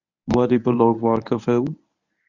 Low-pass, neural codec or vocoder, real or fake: 7.2 kHz; codec, 24 kHz, 0.9 kbps, WavTokenizer, medium speech release version 1; fake